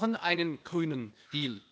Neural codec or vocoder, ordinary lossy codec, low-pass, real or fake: codec, 16 kHz, 0.8 kbps, ZipCodec; none; none; fake